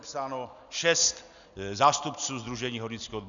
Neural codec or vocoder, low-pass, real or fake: none; 7.2 kHz; real